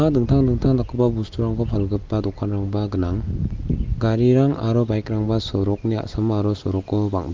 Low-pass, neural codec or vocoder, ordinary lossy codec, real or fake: 7.2 kHz; none; Opus, 32 kbps; real